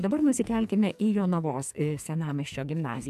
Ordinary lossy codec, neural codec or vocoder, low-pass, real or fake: AAC, 96 kbps; codec, 44.1 kHz, 2.6 kbps, SNAC; 14.4 kHz; fake